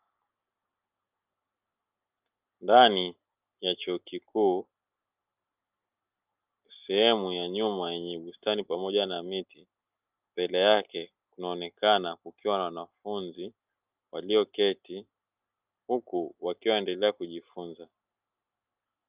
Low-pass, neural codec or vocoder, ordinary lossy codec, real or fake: 3.6 kHz; none; Opus, 24 kbps; real